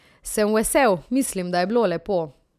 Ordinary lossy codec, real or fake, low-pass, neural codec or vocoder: none; real; 14.4 kHz; none